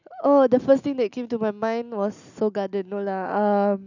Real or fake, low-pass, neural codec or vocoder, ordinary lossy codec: real; 7.2 kHz; none; none